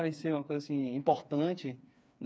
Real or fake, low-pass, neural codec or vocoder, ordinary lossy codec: fake; none; codec, 16 kHz, 4 kbps, FreqCodec, smaller model; none